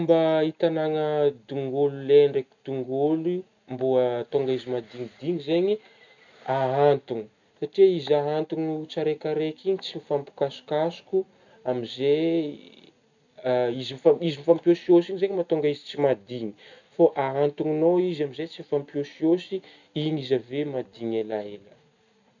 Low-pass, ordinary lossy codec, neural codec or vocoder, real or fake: 7.2 kHz; none; none; real